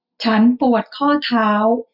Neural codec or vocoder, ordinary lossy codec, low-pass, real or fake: none; none; 5.4 kHz; real